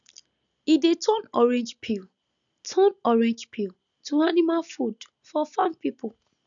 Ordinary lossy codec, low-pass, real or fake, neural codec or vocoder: none; 7.2 kHz; real; none